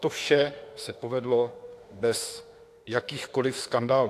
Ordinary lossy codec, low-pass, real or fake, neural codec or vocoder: AAC, 64 kbps; 14.4 kHz; fake; autoencoder, 48 kHz, 32 numbers a frame, DAC-VAE, trained on Japanese speech